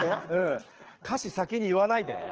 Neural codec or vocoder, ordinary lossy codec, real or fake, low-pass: codec, 24 kHz, 6 kbps, HILCodec; Opus, 16 kbps; fake; 7.2 kHz